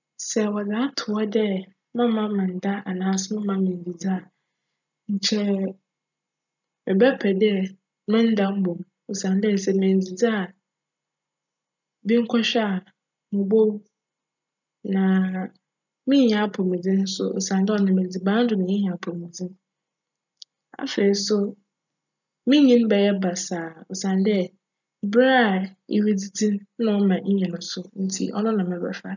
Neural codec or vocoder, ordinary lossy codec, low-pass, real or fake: none; none; 7.2 kHz; real